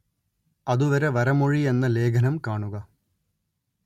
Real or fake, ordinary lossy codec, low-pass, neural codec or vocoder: real; MP3, 64 kbps; 19.8 kHz; none